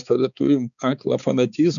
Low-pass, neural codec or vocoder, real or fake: 7.2 kHz; codec, 16 kHz, 4 kbps, X-Codec, HuBERT features, trained on balanced general audio; fake